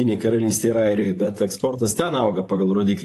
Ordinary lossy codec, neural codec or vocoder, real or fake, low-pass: AAC, 64 kbps; vocoder, 44.1 kHz, 128 mel bands, Pupu-Vocoder; fake; 14.4 kHz